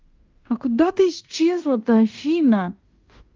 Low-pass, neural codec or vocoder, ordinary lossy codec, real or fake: 7.2 kHz; codec, 16 kHz in and 24 kHz out, 0.9 kbps, LongCat-Audio-Codec, fine tuned four codebook decoder; Opus, 24 kbps; fake